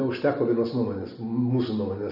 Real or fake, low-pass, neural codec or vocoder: real; 5.4 kHz; none